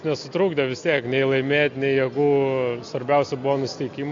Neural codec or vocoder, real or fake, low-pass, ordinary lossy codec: none; real; 7.2 kHz; AAC, 48 kbps